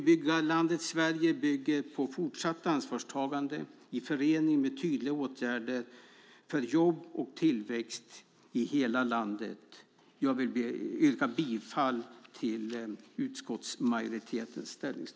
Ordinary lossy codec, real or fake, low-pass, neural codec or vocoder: none; real; none; none